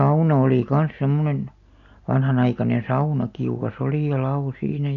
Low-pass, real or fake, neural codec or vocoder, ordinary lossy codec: 7.2 kHz; real; none; none